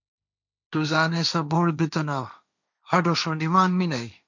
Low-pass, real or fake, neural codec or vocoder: 7.2 kHz; fake; codec, 16 kHz, 1.1 kbps, Voila-Tokenizer